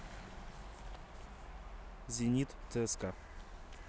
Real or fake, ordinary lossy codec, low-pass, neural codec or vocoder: real; none; none; none